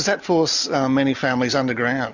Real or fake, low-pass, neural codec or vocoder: real; 7.2 kHz; none